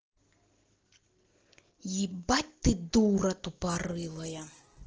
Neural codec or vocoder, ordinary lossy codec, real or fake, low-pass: none; Opus, 16 kbps; real; 7.2 kHz